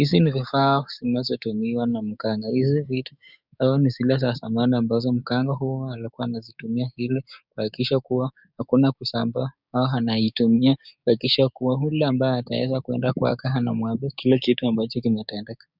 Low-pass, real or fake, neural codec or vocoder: 5.4 kHz; fake; codec, 16 kHz, 6 kbps, DAC